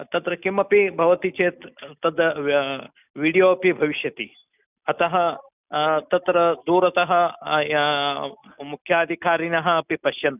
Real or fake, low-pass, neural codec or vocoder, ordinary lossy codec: real; 3.6 kHz; none; none